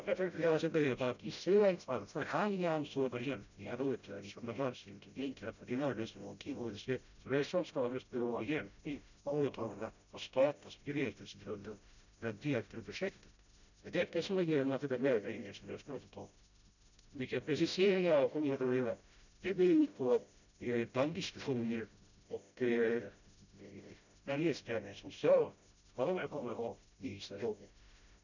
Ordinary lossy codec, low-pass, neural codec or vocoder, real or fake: none; 7.2 kHz; codec, 16 kHz, 0.5 kbps, FreqCodec, smaller model; fake